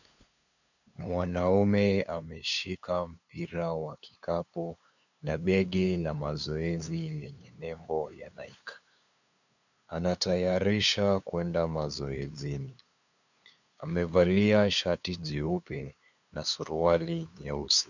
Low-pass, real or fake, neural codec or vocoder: 7.2 kHz; fake; codec, 16 kHz, 2 kbps, FunCodec, trained on LibriTTS, 25 frames a second